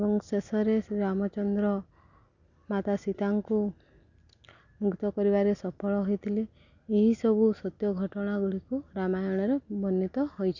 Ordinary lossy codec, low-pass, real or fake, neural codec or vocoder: none; 7.2 kHz; real; none